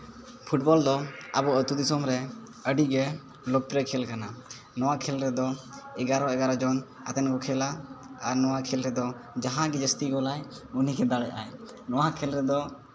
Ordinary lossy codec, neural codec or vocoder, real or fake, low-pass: none; none; real; none